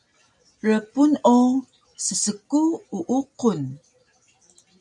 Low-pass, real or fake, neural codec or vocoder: 10.8 kHz; real; none